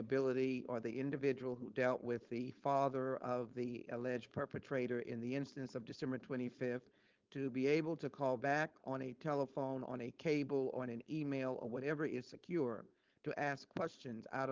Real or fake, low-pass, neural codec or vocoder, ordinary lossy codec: fake; 7.2 kHz; codec, 16 kHz, 4.8 kbps, FACodec; Opus, 32 kbps